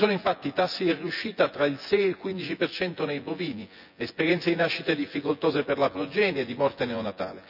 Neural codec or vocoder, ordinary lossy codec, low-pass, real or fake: vocoder, 24 kHz, 100 mel bands, Vocos; none; 5.4 kHz; fake